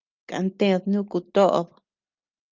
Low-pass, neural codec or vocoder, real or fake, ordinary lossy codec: 7.2 kHz; none; real; Opus, 24 kbps